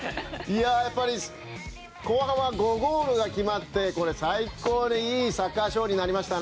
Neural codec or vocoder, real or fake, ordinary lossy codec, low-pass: none; real; none; none